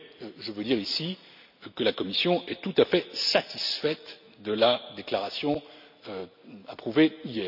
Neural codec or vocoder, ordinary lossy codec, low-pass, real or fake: none; none; 5.4 kHz; real